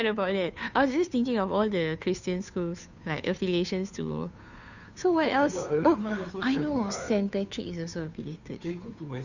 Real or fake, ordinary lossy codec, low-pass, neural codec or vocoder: fake; none; 7.2 kHz; codec, 16 kHz, 2 kbps, FunCodec, trained on Chinese and English, 25 frames a second